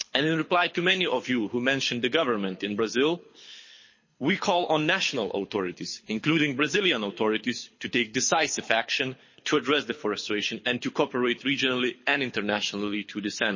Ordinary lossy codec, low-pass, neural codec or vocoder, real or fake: MP3, 32 kbps; 7.2 kHz; codec, 24 kHz, 6 kbps, HILCodec; fake